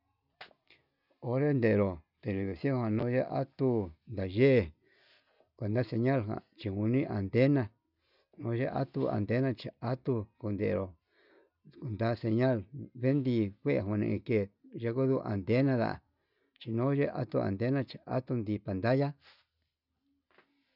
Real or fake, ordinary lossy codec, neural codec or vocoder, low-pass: real; none; none; 5.4 kHz